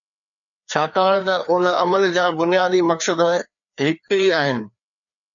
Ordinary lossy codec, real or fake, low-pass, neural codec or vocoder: MP3, 96 kbps; fake; 7.2 kHz; codec, 16 kHz, 2 kbps, FreqCodec, larger model